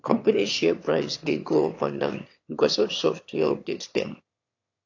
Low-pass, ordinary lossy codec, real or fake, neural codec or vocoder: 7.2 kHz; AAC, 48 kbps; fake; autoencoder, 22.05 kHz, a latent of 192 numbers a frame, VITS, trained on one speaker